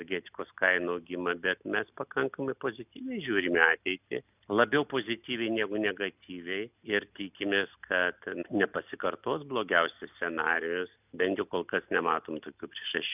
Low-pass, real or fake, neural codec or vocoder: 3.6 kHz; real; none